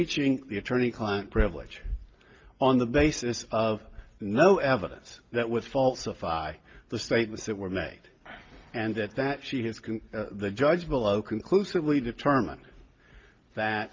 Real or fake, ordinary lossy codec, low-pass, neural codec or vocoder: real; Opus, 24 kbps; 7.2 kHz; none